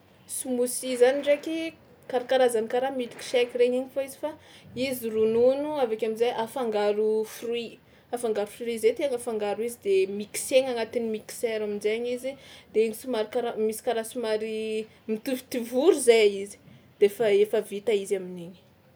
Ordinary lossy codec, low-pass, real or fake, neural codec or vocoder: none; none; real; none